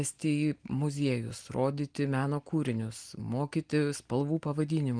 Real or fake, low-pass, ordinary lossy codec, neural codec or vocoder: real; 9.9 kHz; Opus, 24 kbps; none